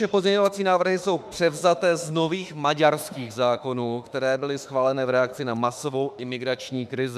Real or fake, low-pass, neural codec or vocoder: fake; 14.4 kHz; autoencoder, 48 kHz, 32 numbers a frame, DAC-VAE, trained on Japanese speech